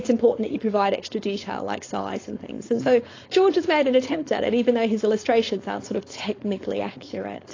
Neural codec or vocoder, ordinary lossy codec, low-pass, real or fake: codec, 16 kHz, 4.8 kbps, FACodec; AAC, 32 kbps; 7.2 kHz; fake